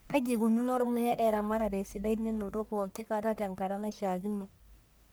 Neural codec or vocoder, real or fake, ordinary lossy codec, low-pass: codec, 44.1 kHz, 1.7 kbps, Pupu-Codec; fake; none; none